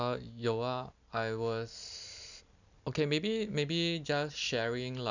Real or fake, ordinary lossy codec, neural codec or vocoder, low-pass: real; none; none; 7.2 kHz